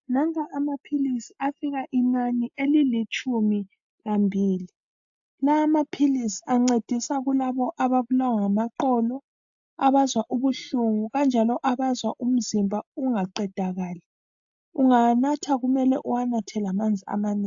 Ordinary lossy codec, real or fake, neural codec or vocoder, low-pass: AAC, 64 kbps; real; none; 7.2 kHz